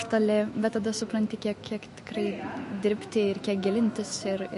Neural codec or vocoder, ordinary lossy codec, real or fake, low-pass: autoencoder, 48 kHz, 128 numbers a frame, DAC-VAE, trained on Japanese speech; MP3, 48 kbps; fake; 14.4 kHz